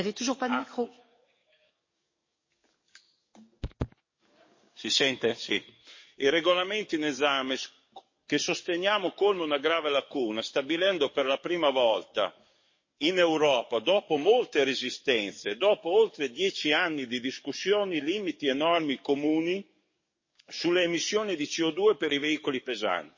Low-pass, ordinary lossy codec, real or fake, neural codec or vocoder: 7.2 kHz; MP3, 32 kbps; fake; codec, 44.1 kHz, 7.8 kbps, DAC